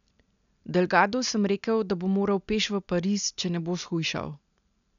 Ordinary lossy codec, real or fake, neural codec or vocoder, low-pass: none; real; none; 7.2 kHz